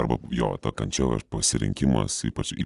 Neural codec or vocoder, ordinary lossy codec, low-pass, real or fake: none; Opus, 64 kbps; 10.8 kHz; real